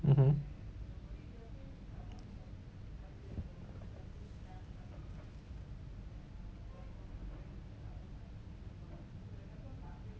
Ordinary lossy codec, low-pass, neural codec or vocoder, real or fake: none; none; none; real